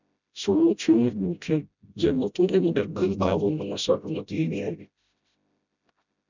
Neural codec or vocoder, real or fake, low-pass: codec, 16 kHz, 0.5 kbps, FreqCodec, smaller model; fake; 7.2 kHz